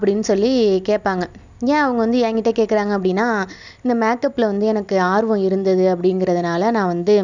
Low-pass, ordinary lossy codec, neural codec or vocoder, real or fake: 7.2 kHz; none; none; real